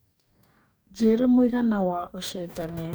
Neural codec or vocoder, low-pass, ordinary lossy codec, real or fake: codec, 44.1 kHz, 2.6 kbps, DAC; none; none; fake